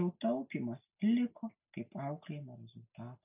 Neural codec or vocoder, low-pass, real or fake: none; 3.6 kHz; real